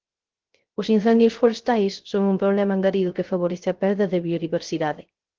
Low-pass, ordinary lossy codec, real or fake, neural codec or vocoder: 7.2 kHz; Opus, 16 kbps; fake; codec, 16 kHz, 0.3 kbps, FocalCodec